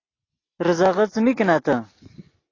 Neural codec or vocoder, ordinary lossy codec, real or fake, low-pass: none; AAC, 32 kbps; real; 7.2 kHz